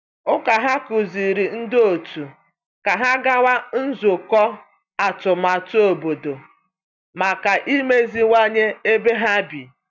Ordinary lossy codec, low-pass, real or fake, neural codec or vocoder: none; 7.2 kHz; real; none